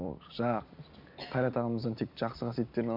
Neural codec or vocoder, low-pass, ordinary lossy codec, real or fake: none; 5.4 kHz; MP3, 48 kbps; real